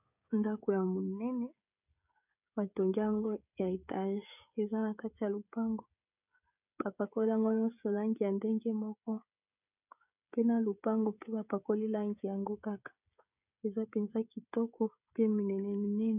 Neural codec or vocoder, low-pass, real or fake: codec, 16 kHz, 16 kbps, FreqCodec, smaller model; 3.6 kHz; fake